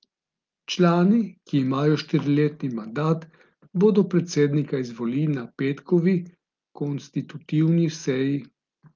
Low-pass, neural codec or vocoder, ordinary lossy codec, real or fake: 7.2 kHz; none; Opus, 32 kbps; real